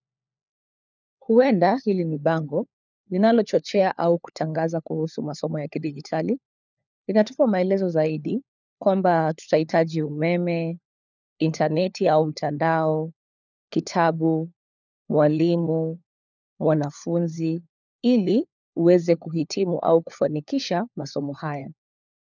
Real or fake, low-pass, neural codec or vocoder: fake; 7.2 kHz; codec, 16 kHz, 4 kbps, FunCodec, trained on LibriTTS, 50 frames a second